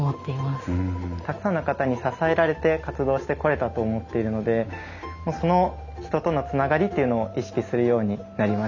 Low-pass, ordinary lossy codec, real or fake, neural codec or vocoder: 7.2 kHz; none; real; none